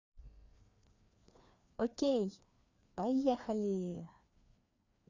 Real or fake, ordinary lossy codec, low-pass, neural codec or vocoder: fake; Opus, 64 kbps; 7.2 kHz; codec, 16 kHz, 2 kbps, FreqCodec, larger model